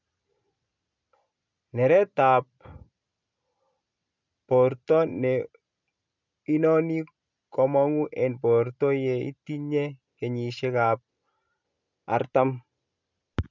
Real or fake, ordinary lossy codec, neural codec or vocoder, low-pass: real; none; none; 7.2 kHz